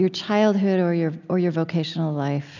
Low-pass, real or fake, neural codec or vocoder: 7.2 kHz; real; none